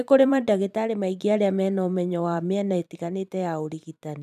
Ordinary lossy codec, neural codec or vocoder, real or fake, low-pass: none; vocoder, 44.1 kHz, 128 mel bands every 512 samples, BigVGAN v2; fake; 14.4 kHz